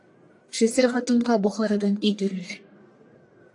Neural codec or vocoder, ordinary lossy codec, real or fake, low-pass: codec, 44.1 kHz, 1.7 kbps, Pupu-Codec; AAC, 64 kbps; fake; 10.8 kHz